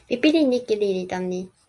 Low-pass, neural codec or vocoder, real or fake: 10.8 kHz; none; real